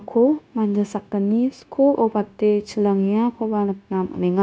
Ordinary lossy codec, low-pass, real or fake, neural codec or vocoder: none; none; fake; codec, 16 kHz, 0.9 kbps, LongCat-Audio-Codec